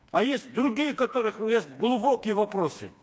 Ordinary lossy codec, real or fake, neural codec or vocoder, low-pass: none; fake; codec, 16 kHz, 2 kbps, FreqCodec, smaller model; none